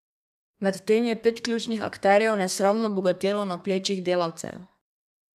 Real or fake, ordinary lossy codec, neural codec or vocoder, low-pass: fake; none; codec, 32 kHz, 1.9 kbps, SNAC; 14.4 kHz